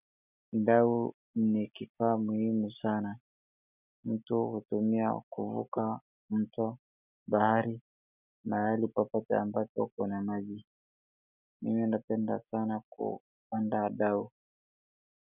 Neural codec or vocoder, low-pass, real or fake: none; 3.6 kHz; real